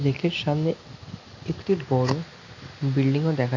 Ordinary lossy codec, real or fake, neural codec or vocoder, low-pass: MP3, 48 kbps; real; none; 7.2 kHz